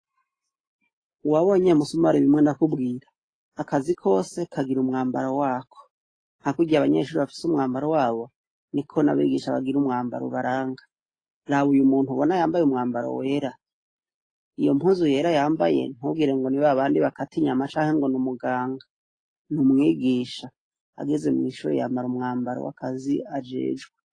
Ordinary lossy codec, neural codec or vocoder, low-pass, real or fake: AAC, 32 kbps; none; 9.9 kHz; real